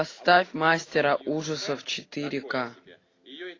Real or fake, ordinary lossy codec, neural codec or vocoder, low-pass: real; AAC, 32 kbps; none; 7.2 kHz